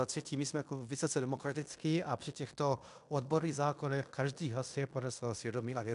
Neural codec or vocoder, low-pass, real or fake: codec, 16 kHz in and 24 kHz out, 0.9 kbps, LongCat-Audio-Codec, fine tuned four codebook decoder; 10.8 kHz; fake